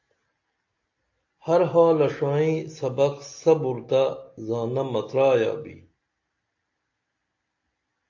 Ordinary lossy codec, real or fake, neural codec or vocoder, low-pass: AAC, 48 kbps; real; none; 7.2 kHz